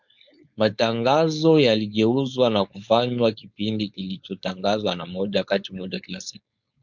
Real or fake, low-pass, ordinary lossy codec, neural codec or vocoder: fake; 7.2 kHz; MP3, 64 kbps; codec, 16 kHz, 4.8 kbps, FACodec